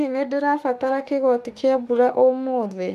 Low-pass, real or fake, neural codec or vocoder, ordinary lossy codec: 14.4 kHz; fake; autoencoder, 48 kHz, 32 numbers a frame, DAC-VAE, trained on Japanese speech; none